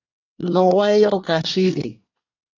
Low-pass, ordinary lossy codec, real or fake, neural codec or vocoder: 7.2 kHz; AAC, 48 kbps; fake; codec, 24 kHz, 1 kbps, SNAC